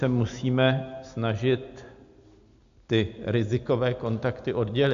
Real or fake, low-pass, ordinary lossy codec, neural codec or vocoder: real; 7.2 kHz; AAC, 64 kbps; none